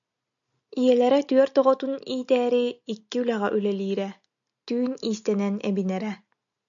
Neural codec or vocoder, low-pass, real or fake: none; 7.2 kHz; real